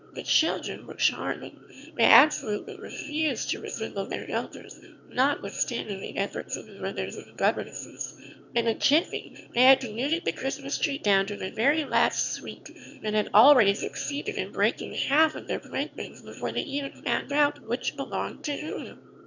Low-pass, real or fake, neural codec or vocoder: 7.2 kHz; fake; autoencoder, 22.05 kHz, a latent of 192 numbers a frame, VITS, trained on one speaker